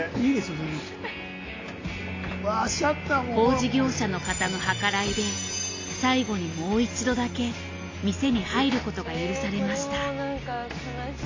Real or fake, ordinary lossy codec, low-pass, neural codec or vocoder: real; AAC, 32 kbps; 7.2 kHz; none